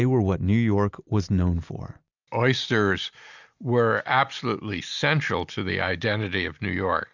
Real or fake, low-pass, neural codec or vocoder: real; 7.2 kHz; none